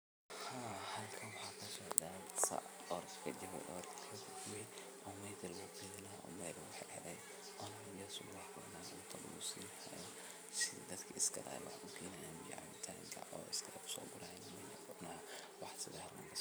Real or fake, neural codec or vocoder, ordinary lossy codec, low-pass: fake; vocoder, 44.1 kHz, 128 mel bands every 512 samples, BigVGAN v2; none; none